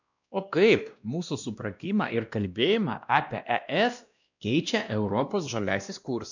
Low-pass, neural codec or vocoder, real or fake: 7.2 kHz; codec, 16 kHz, 1 kbps, X-Codec, WavLM features, trained on Multilingual LibriSpeech; fake